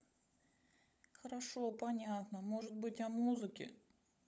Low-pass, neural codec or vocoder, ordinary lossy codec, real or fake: none; codec, 16 kHz, 16 kbps, FunCodec, trained on LibriTTS, 50 frames a second; none; fake